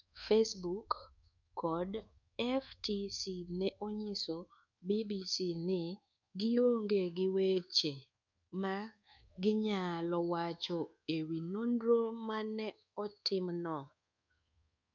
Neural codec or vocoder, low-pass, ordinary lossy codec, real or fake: codec, 24 kHz, 1.2 kbps, DualCodec; 7.2 kHz; none; fake